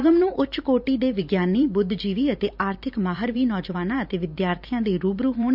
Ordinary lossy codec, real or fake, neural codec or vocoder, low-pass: none; fake; vocoder, 44.1 kHz, 128 mel bands every 512 samples, BigVGAN v2; 5.4 kHz